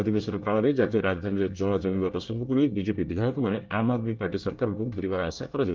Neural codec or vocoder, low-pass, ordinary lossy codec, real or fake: codec, 24 kHz, 1 kbps, SNAC; 7.2 kHz; Opus, 24 kbps; fake